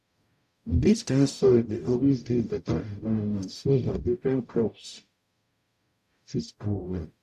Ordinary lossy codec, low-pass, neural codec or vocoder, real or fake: none; 14.4 kHz; codec, 44.1 kHz, 0.9 kbps, DAC; fake